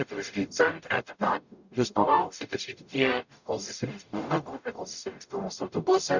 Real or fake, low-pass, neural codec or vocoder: fake; 7.2 kHz; codec, 44.1 kHz, 0.9 kbps, DAC